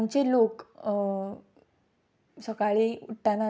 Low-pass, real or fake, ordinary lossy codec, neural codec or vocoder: none; real; none; none